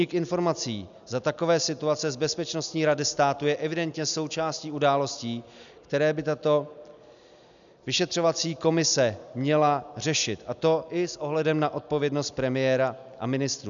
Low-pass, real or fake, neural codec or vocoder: 7.2 kHz; real; none